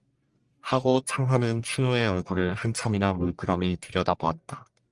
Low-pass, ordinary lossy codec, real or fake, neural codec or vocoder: 10.8 kHz; Opus, 32 kbps; fake; codec, 44.1 kHz, 1.7 kbps, Pupu-Codec